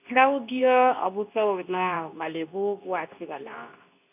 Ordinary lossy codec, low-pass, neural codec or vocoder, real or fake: none; 3.6 kHz; codec, 24 kHz, 0.9 kbps, WavTokenizer, medium speech release version 2; fake